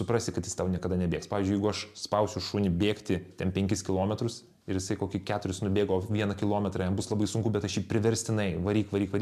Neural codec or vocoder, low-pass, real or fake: none; 14.4 kHz; real